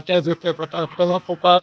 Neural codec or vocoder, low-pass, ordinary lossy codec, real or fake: codec, 16 kHz, 0.8 kbps, ZipCodec; none; none; fake